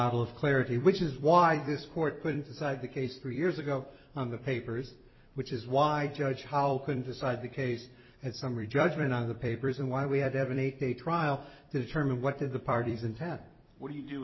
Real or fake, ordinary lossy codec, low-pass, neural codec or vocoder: fake; MP3, 24 kbps; 7.2 kHz; vocoder, 44.1 kHz, 128 mel bands every 256 samples, BigVGAN v2